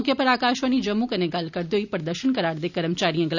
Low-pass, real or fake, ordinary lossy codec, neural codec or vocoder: 7.2 kHz; real; none; none